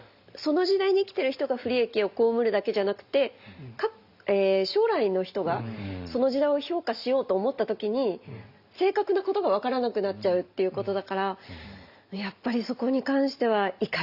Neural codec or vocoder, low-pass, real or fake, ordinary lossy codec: none; 5.4 kHz; real; Opus, 64 kbps